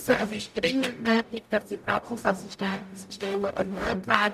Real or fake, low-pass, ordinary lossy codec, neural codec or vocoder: fake; 14.4 kHz; none; codec, 44.1 kHz, 0.9 kbps, DAC